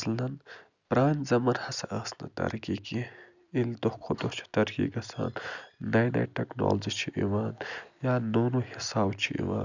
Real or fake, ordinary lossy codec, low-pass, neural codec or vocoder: real; none; 7.2 kHz; none